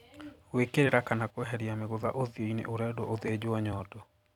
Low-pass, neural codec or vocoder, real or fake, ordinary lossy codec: 19.8 kHz; vocoder, 44.1 kHz, 128 mel bands every 256 samples, BigVGAN v2; fake; none